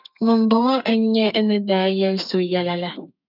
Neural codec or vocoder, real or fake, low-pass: codec, 32 kHz, 1.9 kbps, SNAC; fake; 5.4 kHz